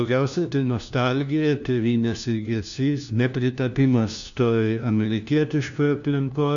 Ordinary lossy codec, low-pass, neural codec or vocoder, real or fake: MP3, 96 kbps; 7.2 kHz; codec, 16 kHz, 1 kbps, FunCodec, trained on LibriTTS, 50 frames a second; fake